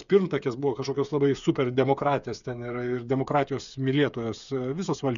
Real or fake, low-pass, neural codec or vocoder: fake; 7.2 kHz; codec, 16 kHz, 8 kbps, FreqCodec, smaller model